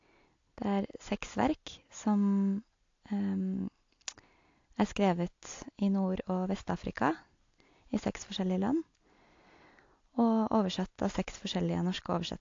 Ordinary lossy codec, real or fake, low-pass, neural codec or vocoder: AAC, 48 kbps; real; 7.2 kHz; none